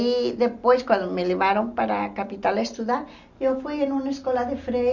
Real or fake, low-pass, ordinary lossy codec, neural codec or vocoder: real; 7.2 kHz; Opus, 64 kbps; none